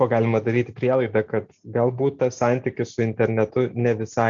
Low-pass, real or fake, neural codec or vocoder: 7.2 kHz; real; none